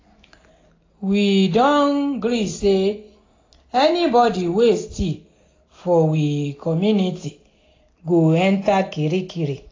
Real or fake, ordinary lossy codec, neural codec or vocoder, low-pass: real; AAC, 32 kbps; none; 7.2 kHz